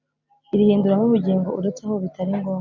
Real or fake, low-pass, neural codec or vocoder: real; 7.2 kHz; none